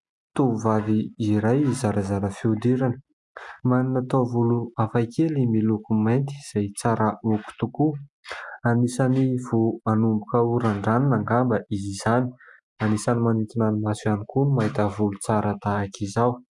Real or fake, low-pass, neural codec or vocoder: real; 10.8 kHz; none